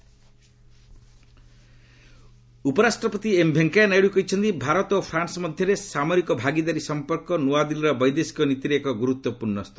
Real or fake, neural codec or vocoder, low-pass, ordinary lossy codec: real; none; none; none